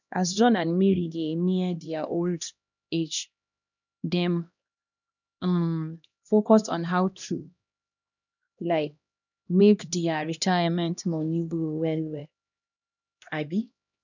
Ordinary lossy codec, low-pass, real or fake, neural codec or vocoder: none; 7.2 kHz; fake; codec, 16 kHz, 1 kbps, X-Codec, HuBERT features, trained on LibriSpeech